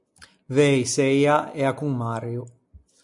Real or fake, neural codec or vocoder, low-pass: real; none; 10.8 kHz